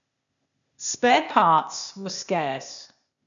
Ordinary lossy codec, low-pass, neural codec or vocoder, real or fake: none; 7.2 kHz; codec, 16 kHz, 0.8 kbps, ZipCodec; fake